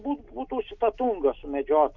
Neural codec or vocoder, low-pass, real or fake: none; 7.2 kHz; real